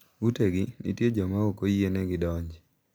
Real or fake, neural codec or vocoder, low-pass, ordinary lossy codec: real; none; none; none